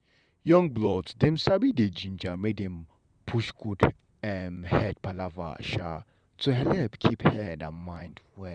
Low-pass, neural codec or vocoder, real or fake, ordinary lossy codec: 9.9 kHz; vocoder, 44.1 kHz, 128 mel bands, Pupu-Vocoder; fake; none